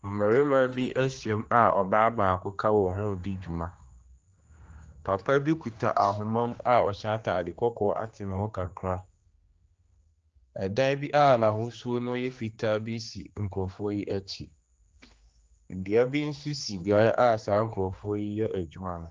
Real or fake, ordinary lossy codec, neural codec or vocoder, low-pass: fake; Opus, 24 kbps; codec, 16 kHz, 2 kbps, X-Codec, HuBERT features, trained on general audio; 7.2 kHz